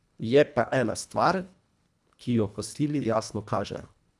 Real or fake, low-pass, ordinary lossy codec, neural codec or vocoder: fake; none; none; codec, 24 kHz, 1.5 kbps, HILCodec